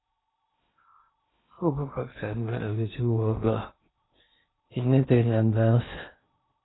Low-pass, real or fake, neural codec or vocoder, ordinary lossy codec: 7.2 kHz; fake; codec, 16 kHz in and 24 kHz out, 0.6 kbps, FocalCodec, streaming, 2048 codes; AAC, 16 kbps